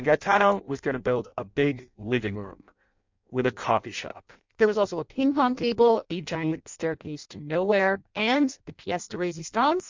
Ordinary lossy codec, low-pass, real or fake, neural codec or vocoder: MP3, 64 kbps; 7.2 kHz; fake; codec, 16 kHz in and 24 kHz out, 0.6 kbps, FireRedTTS-2 codec